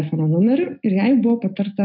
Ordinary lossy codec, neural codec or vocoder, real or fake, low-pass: AAC, 48 kbps; none; real; 5.4 kHz